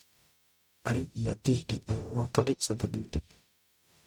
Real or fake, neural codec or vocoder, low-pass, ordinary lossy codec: fake; codec, 44.1 kHz, 0.9 kbps, DAC; 19.8 kHz; none